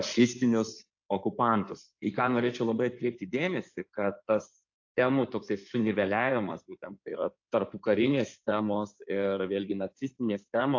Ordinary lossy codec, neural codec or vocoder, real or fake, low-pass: AAC, 48 kbps; codec, 16 kHz in and 24 kHz out, 2.2 kbps, FireRedTTS-2 codec; fake; 7.2 kHz